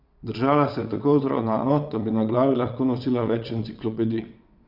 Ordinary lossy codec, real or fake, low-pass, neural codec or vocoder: none; fake; 5.4 kHz; vocoder, 22.05 kHz, 80 mel bands, WaveNeXt